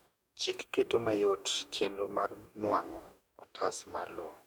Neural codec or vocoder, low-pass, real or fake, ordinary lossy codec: codec, 44.1 kHz, 2.6 kbps, DAC; none; fake; none